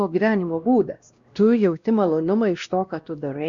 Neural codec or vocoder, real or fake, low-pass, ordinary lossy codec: codec, 16 kHz, 1 kbps, X-Codec, WavLM features, trained on Multilingual LibriSpeech; fake; 7.2 kHz; Opus, 64 kbps